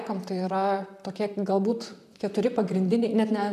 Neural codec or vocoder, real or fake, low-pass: vocoder, 44.1 kHz, 128 mel bands, Pupu-Vocoder; fake; 14.4 kHz